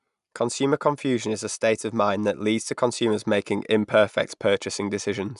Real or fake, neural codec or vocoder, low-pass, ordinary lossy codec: real; none; 10.8 kHz; none